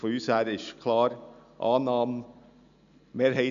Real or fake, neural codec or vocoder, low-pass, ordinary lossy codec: real; none; 7.2 kHz; none